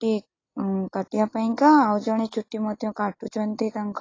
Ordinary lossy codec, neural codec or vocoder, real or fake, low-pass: AAC, 32 kbps; none; real; 7.2 kHz